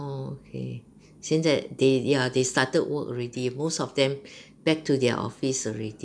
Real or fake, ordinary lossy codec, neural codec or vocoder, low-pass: real; none; none; 9.9 kHz